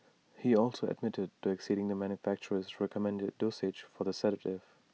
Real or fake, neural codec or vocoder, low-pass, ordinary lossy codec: real; none; none; none